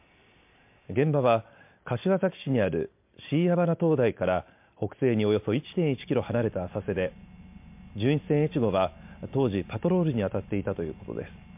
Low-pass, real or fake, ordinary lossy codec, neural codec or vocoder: 3.6 kHz; real; MP3, 32 kbps; none